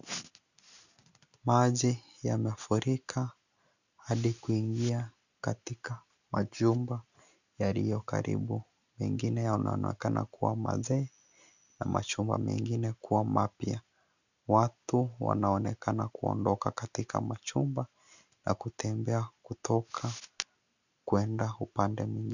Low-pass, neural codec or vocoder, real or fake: 7.2 kHz; none; real